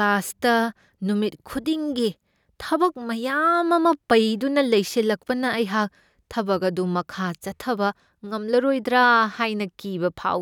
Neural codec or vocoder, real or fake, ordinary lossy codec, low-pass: none; real; none; 19.8 kHz